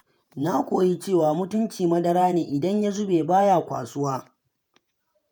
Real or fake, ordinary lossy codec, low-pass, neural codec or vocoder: fake; none; none; vocoder, 48 kHz, 128 mel bands, Vocos